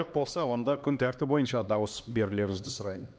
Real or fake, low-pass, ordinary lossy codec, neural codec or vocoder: fake; none; none; codec, 16 kHz, 2 kbps, X-Codec, HuBERT features, trained on LibriSpeech